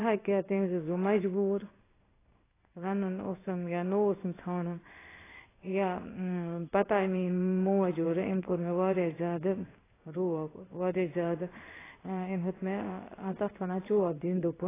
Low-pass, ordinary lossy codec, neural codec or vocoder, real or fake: 3.6 kHz; AAC, 16 kbps; codec, 16 kHz in and 24 kHz out, 1 kbps, XY-Tokenizer; fake